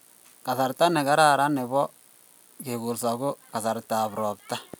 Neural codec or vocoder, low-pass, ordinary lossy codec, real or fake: none; none; none; real